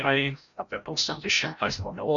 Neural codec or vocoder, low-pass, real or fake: codec, 16 kHz, 0.5 kbps, FreqCodec, larger model; 7.2 kHz; fake